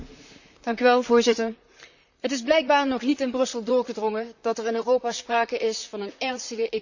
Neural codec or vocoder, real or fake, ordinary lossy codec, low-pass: vocoder, 44.1 kHz, 128 mel bands, Pupu-Vocoder; fake; none; 7.2 kHz